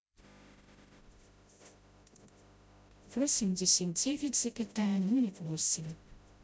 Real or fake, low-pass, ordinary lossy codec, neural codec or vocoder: fake; none; none; codec, 16 kHz, 0.5 kbps, FreqCodec, smaller model